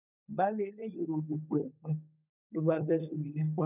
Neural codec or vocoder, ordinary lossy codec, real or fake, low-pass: codec, 16 kHz, 16 kbps, FunCodec, trained on LibriTTS, 50 frames a second; none; fake; 3.6 kHz